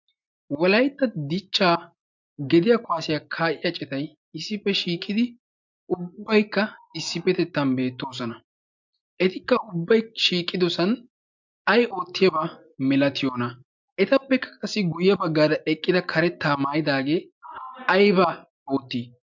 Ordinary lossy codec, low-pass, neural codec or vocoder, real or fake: MP3, 64 kbps; 7.2 kHz; none; real